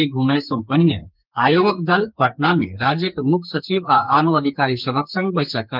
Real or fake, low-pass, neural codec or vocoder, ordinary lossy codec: fake; 5.4 kHz; codec, 44.1 kHz, 2.6 kbps, SNAC; Opus, 24 kbps